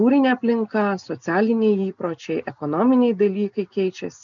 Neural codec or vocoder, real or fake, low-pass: none; real; 7.2 kHz